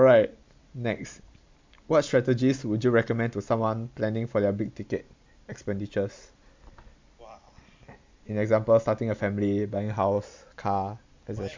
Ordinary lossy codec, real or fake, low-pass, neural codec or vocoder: MP3, 64 kbps; real; 7.2 kHz; none